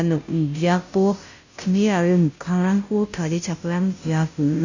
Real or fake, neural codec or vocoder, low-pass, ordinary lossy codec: fake; codec, 16 kHz, 0.5 kbps, FunCodec, trained on Chinese and English, 25 frames a second; 7.2 kHz; none